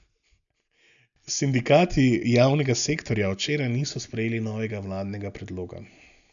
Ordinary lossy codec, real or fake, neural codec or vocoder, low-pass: none; real; none; 7.2 kHz